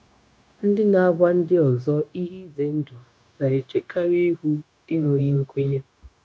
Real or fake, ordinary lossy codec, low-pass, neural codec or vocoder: fake; none; none; codec, 16 kHz, 0.9 kbps, LongCat-Audio-Codec